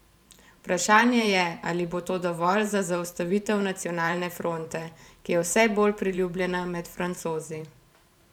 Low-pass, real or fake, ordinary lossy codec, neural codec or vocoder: 19.8 kHz; fake; none; vocoder, 44.1 kHz, 128 mel bands every 512 samples, BigVGAN v2